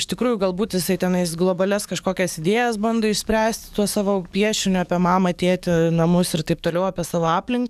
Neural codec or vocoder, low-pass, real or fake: codec, 44.1 kHz, 7.8 kbps, DAC; 14.4 kHz; fake